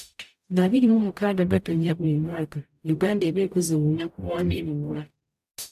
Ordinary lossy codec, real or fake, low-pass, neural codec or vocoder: AAC, 96 kbps; fake; 14.4 kHz; codec, 44.1 kHz, 0.9 kbps, DAC